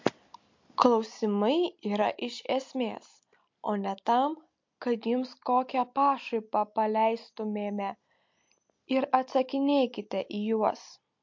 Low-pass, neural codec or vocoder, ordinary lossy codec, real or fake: 7.2 kHz; none; MP3, 48 kbps; real